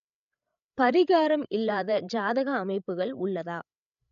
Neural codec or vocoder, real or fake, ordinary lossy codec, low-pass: codec, 16 kHz, 16 kbps, FreqCodec, larger model; fake; none; 7.2 kHz